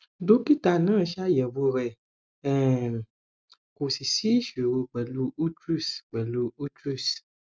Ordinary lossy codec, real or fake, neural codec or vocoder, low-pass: none; real; none; none